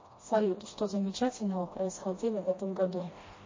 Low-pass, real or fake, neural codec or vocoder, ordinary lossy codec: 7.2 kHz; fake; codec, 16 kHz, 1 kbps, FreqCodec, smaller model; MP3, 32 kbps